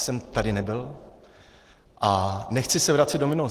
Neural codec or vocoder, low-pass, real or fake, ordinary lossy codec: none; 14.4 kHz; real; Opus, 16 kbps